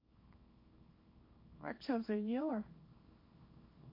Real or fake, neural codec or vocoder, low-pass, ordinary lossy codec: fake; codec, 24 kHz, 0.9 kbps, WavTokenizer, small release; 5.4 kHz; MP3, 32 kbps